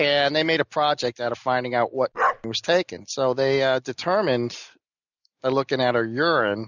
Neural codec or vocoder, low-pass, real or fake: none; 7.2 kHz; real